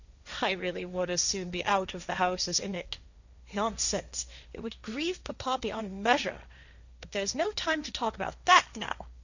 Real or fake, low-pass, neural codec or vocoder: fake; 7.2 kHz; codec, 16 kHz, 1.1 kbps, Voila-Tokenizer